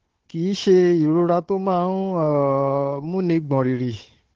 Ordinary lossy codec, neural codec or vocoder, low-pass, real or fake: Opus, 16 kbps; codec, 16 kHz, 4 kbps, FunCodec, trained on Chinese and English, 50 frames a second; 7.2 kHz; fake